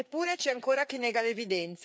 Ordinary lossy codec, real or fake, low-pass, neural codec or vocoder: none; fake; none; codec, 16 kHz, 4 kbps, FreqCodec, larger model